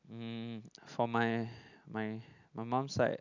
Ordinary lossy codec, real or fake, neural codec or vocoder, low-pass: none; real; none; 7.2 kHz